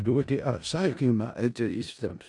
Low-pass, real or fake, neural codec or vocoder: 10.8 kHz; fake; codec, 16 kHz in and 24 kHz out, 0.4 kbps, LongCat-Audio-Codec, four codebook decoder